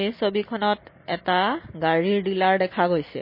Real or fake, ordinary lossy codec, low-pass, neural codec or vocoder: real; MP3, 24 kbps; 5.4 kHz; none